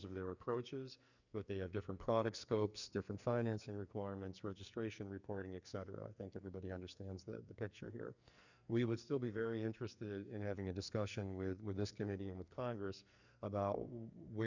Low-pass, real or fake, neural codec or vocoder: 7.2 kHz; fake; codec, 44.1 kHz, 2.6 kbps, SNAC